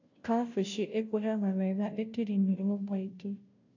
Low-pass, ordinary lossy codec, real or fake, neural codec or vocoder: 7.2 kHz; AAC, 48 kbps; fake; codec, 16 kHz, 0.5 kbps, FunCodec, trained on Chinese and English, 25 frames a second